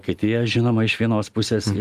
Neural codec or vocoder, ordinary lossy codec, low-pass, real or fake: none; Opus, 32 kbps; 14.4 kHz; real